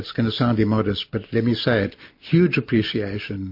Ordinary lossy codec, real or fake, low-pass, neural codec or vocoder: MP3, 32 kbps; real; 5.4 kHz; none